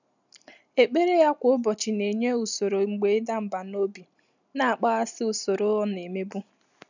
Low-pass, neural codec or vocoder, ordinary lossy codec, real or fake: 7.2 kHz; none; none; real